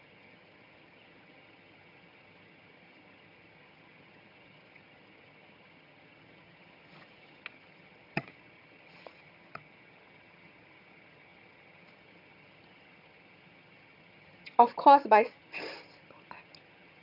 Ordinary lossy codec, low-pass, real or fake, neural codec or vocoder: none; 5.4 kHz; fake; vocoder, 22.05 kHz, 80 mel bands, HiFi-GAN